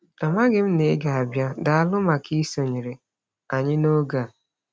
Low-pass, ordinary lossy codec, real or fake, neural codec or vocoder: none; none; real; none